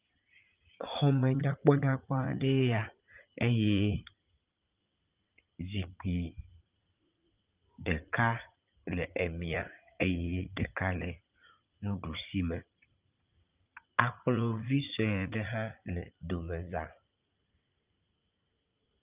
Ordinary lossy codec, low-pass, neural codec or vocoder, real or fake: Opus, 24 kbps; 3.6 kHz; vocoder, 44.1 kHz, 80 mel bands, Vocos; fake